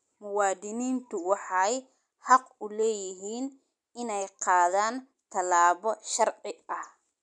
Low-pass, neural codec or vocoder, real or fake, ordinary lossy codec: 10.8 kHz; none; real; none